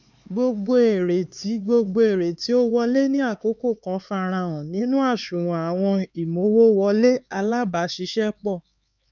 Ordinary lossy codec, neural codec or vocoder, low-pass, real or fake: none; codec, 16 kHz, 4 kbps, X-Codec, HuBERT features, trained on LibriSpeech; 7.2 kHz; fake